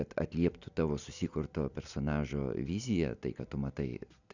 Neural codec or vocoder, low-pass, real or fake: none; 7.2 kHz; real